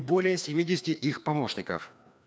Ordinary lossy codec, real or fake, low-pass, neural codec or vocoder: none; fake; none; codec, 16 kHz, 2 kbps, FreqCodec, larger model